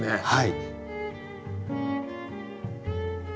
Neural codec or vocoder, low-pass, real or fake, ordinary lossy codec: none; none; real; none